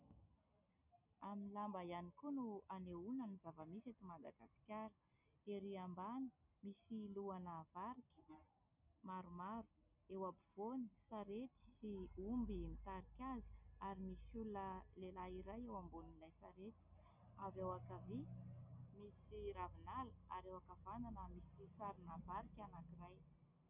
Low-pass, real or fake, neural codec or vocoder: 3.6 kHz; real; none